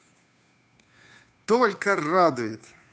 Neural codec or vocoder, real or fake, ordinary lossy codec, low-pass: codec, 16 kHz, 2 kbps, FunCodec, trained on Chinese and English, 25 frames a second; fake; none; none